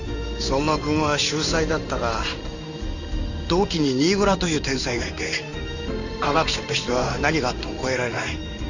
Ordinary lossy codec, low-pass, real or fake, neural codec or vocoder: none; 7.2 kHz; fake; codec, 16 kHz in and 24 kHz out, 1 kbps, XY-Tokenizer